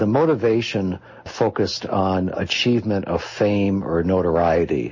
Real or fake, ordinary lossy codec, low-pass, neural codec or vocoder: real; MP3, 32 kbps; 7.2 kHz; none